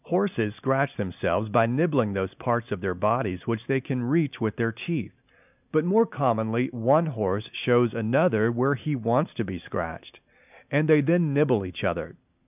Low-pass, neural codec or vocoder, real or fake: 3.6 kHz; none; real